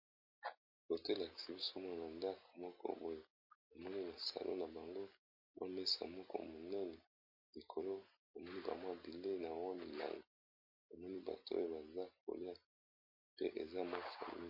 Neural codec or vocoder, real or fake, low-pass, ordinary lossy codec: none; real; 5.4 kHz; MP3, 32 kbps